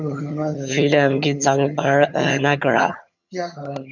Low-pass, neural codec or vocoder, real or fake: 7.2 kHz; vocoder, 22.05 kHz, 80 mel bands, HiFi-GAN; fake